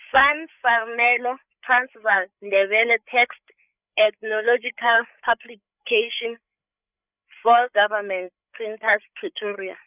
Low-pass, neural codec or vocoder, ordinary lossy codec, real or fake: 3.6 kHz; codec, 16 kHz, 8 kbps, FreqCodec, larger model; none; fake